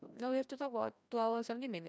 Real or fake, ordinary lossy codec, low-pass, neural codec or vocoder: fake; none; none; codec, 16 kHz, 1 kbps, FreqCodec, larger model